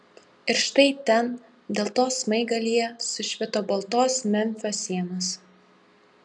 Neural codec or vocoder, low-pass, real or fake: none; 10.8 kHz; real